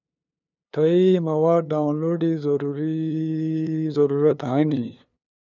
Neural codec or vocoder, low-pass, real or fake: codec, 16 kHz, 2 kbps, FunCodec, trained on LibriTTS, 25 frames a second; 7.2 kHz; fake